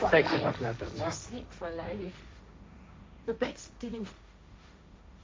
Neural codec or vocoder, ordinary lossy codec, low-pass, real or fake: codec, 16 kHz, 1.1 kbps, Voila-Tokenizer; none; none; fake